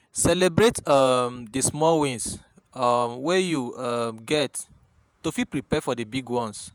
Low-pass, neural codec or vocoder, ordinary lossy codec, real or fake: none; vocoder, 48 kHz, 128 mel bands, Vocos; none; fake